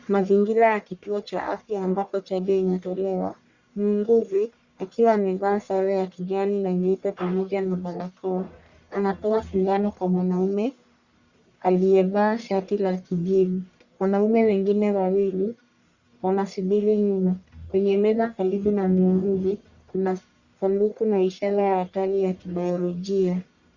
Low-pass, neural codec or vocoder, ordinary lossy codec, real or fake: 7.2 kHz; codec, 44.1 kHz, 1.7 kbps, Pupu-Codec; Opus, 64 kbps; fake